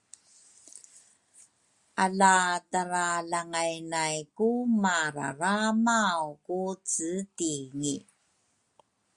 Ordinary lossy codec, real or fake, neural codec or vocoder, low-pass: Opus, 64 kbps; real; none; 10.8 kHz